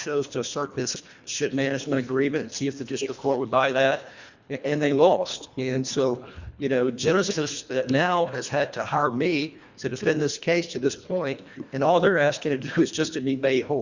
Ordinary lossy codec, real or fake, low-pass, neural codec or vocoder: Opus, 64 kbps; fake; 7.2 kHz; codec, 24 kHz, 1.5 kbps, HILCodec